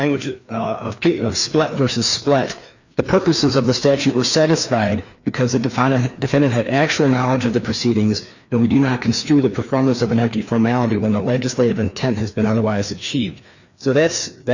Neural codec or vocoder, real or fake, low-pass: codec, 16 kHz, 2 kbps, FreqCodec, larger model; fake; 7.2 kHz